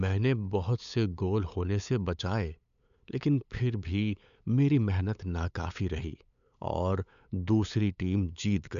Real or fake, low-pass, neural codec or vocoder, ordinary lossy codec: fake; 7.2 kHz; codec, 16 kHz, 8 kbps, FunCodec, trained on LibriTTS, 25 frames a second; none